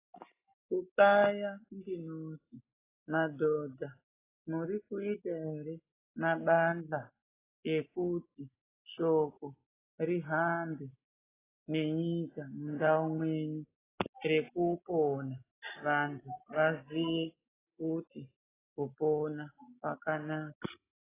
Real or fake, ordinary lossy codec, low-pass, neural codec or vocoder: real; AAC, 16 kbps; 3.6 kHz; none